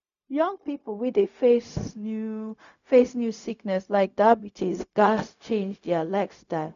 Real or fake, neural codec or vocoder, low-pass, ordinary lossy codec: fake; codec, 16 kHz, 0.4 kbps, LongCat-Audio-Codec; 7.2 kHz; none